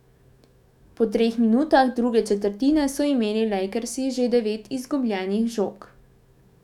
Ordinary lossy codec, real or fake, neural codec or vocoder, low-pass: none; fake; autoencoder, 48 kHz, 128 numbers a frame, DAC-VAE, trained on Japanese speech; 19.8 kHz